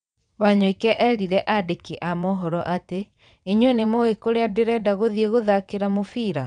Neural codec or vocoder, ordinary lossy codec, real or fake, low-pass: vocoder, 22.05 kHz, 80 mel bands, WaveNeXt; none; fake; 9.9 kHz